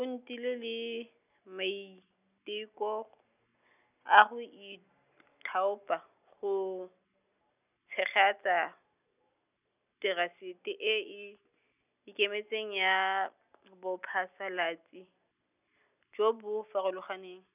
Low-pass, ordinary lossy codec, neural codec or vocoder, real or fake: 3.6 kHz; none; none; real